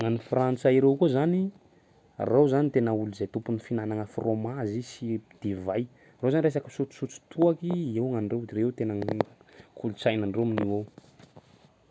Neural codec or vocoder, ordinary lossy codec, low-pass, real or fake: none; none; none; real